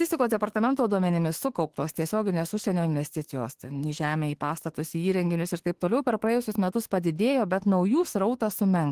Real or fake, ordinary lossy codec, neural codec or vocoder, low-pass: fake; Opus, 16 kbps; autoencoder, 48 kHz, 32 numbers a frame, DAC-VAE, trained on Japanese speech; 14.4 kHz